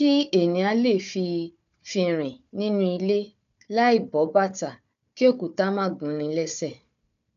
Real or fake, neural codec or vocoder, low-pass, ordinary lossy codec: fake; codec, 16 kHz, 4 kbps, FunCodec, trained on Chinese and English, 50 frames a second; 7.2 kHz; none